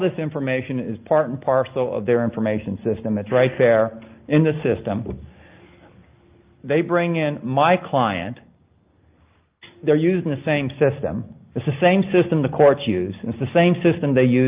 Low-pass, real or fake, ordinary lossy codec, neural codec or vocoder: 3.6 kHz; real; Opus, 24 kbps; none